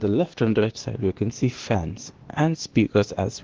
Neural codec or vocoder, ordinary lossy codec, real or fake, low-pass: codec, 16 kHz, 0.8 kbps, ZipCodec; Opus, 16 kbps; fake; 7.2 kHz